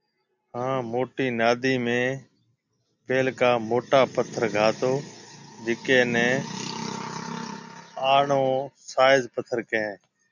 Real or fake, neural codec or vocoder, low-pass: real; none; 7.2 kHz